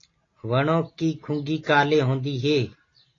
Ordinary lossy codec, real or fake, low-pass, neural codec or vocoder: AAC, 32 kbps; real; 7.2 kHz; none